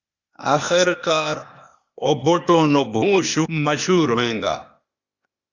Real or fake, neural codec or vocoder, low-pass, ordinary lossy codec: fake; codec, 16 kHz, 0.8 kbps, ZipCodec; 7.2 kHz; Opus, 64 kbps